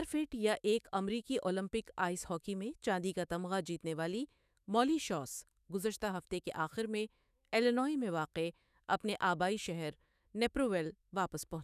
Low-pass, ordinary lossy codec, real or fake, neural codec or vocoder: 14.4 kHz; none; fake; autoencoder, 48 kHz, 128 numbers a frame, DAC-VAE, trained on Japanese speech